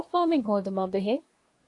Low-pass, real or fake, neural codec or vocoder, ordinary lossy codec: 10.8 kHz; fake; codec, 24 kHz, 1 kbps, SNAC; AAC, 48 kbps